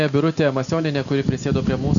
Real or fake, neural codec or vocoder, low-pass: real; none; 7.2 kHz